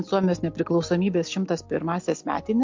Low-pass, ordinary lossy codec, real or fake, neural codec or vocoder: 7.2 kHz; MP3, 48 kbps; real; none